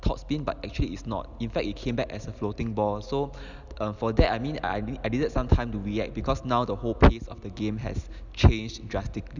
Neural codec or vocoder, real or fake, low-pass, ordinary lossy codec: none; real; 7.2 kHz; none